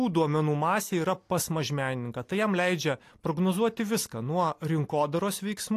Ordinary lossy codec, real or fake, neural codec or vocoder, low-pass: AAC, 64 kbps; real; none; 14.4 kHz